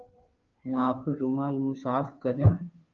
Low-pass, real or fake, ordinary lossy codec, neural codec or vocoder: 7.2 kHz; fake; Opus, 24 kbps; codec, 16 kHz, 2 kbps, FunCodec, trained on Chinese and English, 25 frames a second